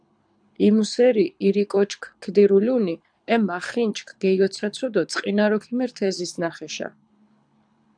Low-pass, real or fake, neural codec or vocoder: 9.9 kHz; fake; codec, 24 kHz, 6 kbps, HILCodec